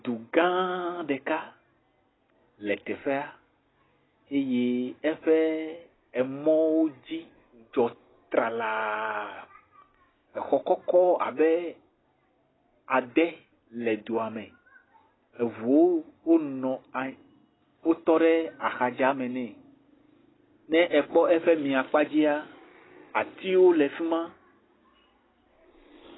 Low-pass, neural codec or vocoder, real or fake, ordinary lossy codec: 7.2 kHz; none; real; AAC, 16 kbps